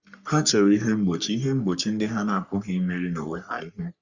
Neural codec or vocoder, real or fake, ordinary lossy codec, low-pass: codec, 44.1 kHz, 3.4 kbps, Pupu-Codec; fake; Opus, 64 kbps; 7.2 kHz